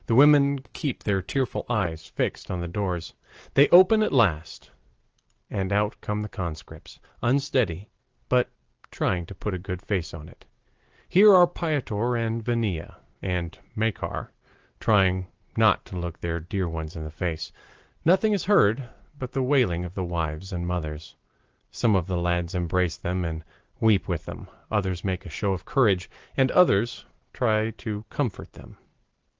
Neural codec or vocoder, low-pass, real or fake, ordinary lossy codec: none; 7.2 kHz; real; Opus, 16 kbps